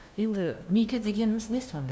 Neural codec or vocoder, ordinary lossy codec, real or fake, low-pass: codec, 16 kHz, 0.5 kbps, FunCodec, trained on LibriTTS, 25 frames a second; none; fake; none